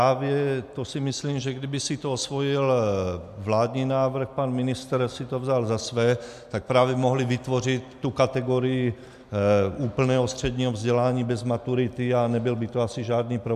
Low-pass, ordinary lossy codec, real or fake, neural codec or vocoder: 14.4 kHz; MP3, 96 kbps; real; none